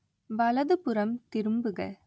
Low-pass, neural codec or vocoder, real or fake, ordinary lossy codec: none; none; real; none